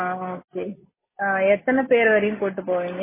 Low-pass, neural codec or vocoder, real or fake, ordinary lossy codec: 3.6 kHz; none; real; MP3, 16 kbps